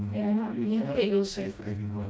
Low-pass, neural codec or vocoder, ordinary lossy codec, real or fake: none; codec, 16 kHz, 1 kbps, FreqCodec, smaller model; none; fake